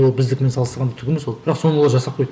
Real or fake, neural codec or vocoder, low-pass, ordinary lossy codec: real; none; none; none